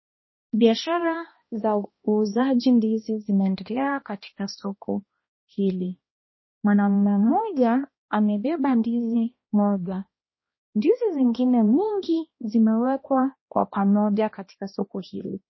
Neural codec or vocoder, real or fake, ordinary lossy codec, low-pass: codec, 16 kHz, 1 kbps, X-Codec, HuBERT features, trained on balanced general audio; fake; MP3, 24 kbps; 7.2 kHz